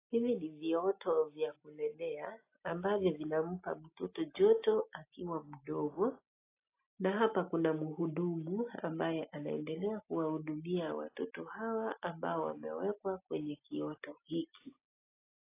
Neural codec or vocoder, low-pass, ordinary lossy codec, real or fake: none; 3.6 kHz; AAC, 24 kbps; real